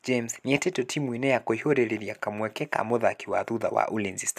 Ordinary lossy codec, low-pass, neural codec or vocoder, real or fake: AAC, 96 kbps; 14.4 kHz; none; real